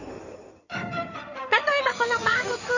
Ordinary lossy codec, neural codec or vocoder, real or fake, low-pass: none; codec, 16 kHz in and 24 kHz out, 2.2 kbps, FireRedTTS-2 codec; fake; 7.2 kHz